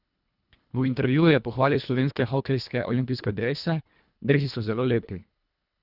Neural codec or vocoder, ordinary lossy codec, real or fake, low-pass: codec, 24 kHz, 1.5 kbps, HILCodec; Opus, 64 kbps; fake; 5.4 kHz